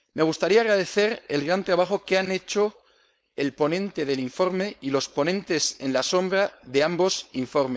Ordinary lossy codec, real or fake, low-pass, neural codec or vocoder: none; fake; none; codec, 16 kHz, 4.8 kbps, FACodec